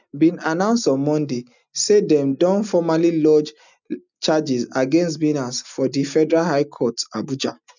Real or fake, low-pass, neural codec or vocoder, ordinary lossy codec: real; 7.2 kHz; none; none